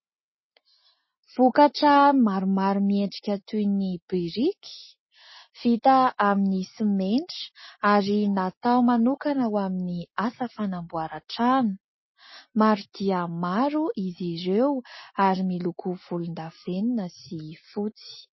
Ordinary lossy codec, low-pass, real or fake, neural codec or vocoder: MP3, 24 kbps; 7.2 kHz; real; none